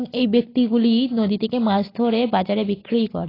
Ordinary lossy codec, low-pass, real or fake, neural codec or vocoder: AAC, 24 kbps; 5.4 kHz; real; none